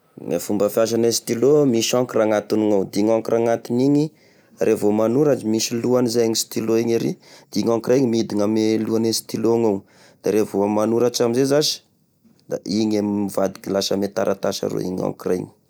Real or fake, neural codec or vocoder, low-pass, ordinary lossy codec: real; none; none; none